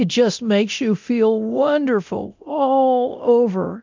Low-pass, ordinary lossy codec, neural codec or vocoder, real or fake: 7.2 kHz; MP3, 64 kbps; codec, 24 kHz, 0.9 kbps, DualCodec; fake